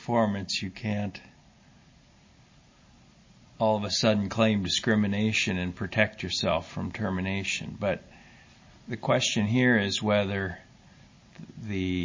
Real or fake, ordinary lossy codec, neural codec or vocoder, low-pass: real; MP3, 32 kbps; none; 7.2 kHz